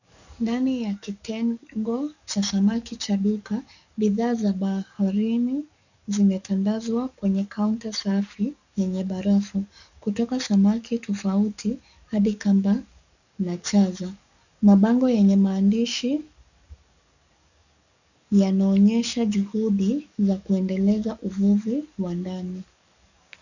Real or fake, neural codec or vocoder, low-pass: fake; codec, 44.1 kHz, 7.8 kbps, DAC; 7.2 kHz